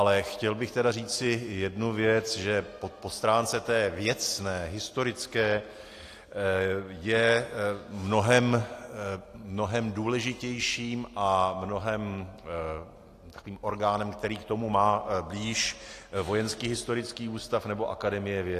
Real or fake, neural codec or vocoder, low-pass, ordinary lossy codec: real; none; 14.4 kHz; AAC, 48 kbps